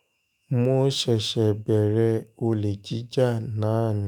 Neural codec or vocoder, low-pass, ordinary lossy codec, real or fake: autoencoder, 48 kHz, 128 numbers a frame, DAC-VAE, trained on Japanese speech; none; none; fake